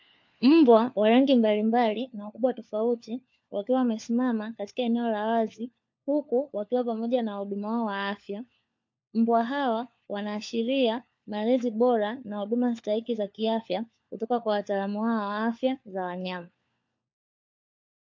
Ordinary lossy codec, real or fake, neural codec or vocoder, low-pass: MP3, 48 kbps; fake; codec, 16 kHz, 4 kbps, FunCodec, trained on LibriTTS, 50 frames a second; 7.2 kHz